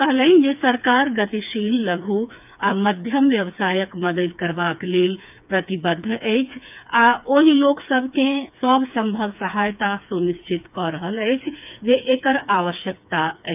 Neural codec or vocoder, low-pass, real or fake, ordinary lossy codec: codec, 16 kHz, 4 kbps, FreqCodec, smaller model; 3.6 kHz; fake; none